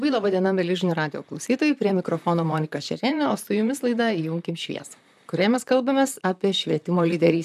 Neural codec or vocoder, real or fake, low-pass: vocoder, 44.1 kHz, 128 mel bands, Pupu-Vocoder; fake; 14.4 kHz